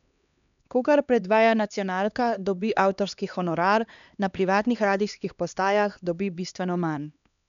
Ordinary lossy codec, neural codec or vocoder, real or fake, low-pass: none; codec, 16 kHz, 2 kbps, X-Codec, HuBERT features, trained on LibriSpeech; fake; 7.2 kHz